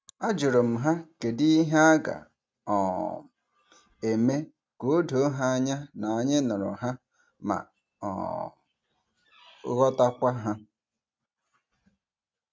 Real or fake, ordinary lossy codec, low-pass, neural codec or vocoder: real; none; none; none